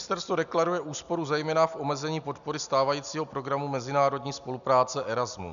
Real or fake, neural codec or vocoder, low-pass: real; none; 7.2 kHz